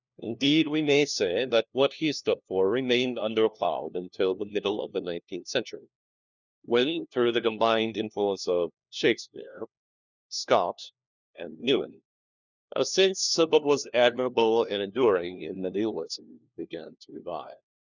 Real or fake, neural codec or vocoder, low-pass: fake; codec, 16 kHz, 1 kbps, FunCodec, trained on LibriTTS, 50 frames a second; 7.2 kHz